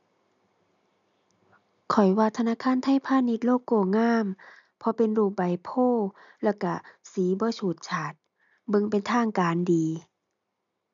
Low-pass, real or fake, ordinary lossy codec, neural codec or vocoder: 7.2 kHz; real; none; none